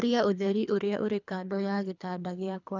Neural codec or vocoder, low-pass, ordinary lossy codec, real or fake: codec, 24 kHz, 3 kbps, HILCodec; 7.2 kHz; none; fake